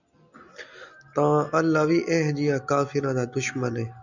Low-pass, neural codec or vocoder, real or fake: 7.2 kHz; none; real